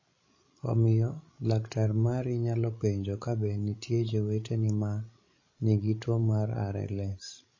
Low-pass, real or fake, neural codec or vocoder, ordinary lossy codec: 7.2 kHz; fake; codec, 16 kHz, 16 kbps, FreqCodec, larger model; MP3, 32 kbps